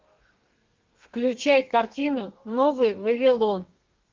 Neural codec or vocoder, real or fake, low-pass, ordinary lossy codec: codec, 32 kHz, 1.9 kbps, SNAC; fake; 7.2 kHz; Opus, 32 kbps